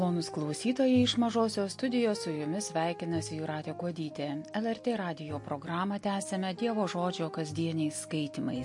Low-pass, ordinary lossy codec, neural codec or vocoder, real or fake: 10.8 kHz; MP3, 48 kbps; none; real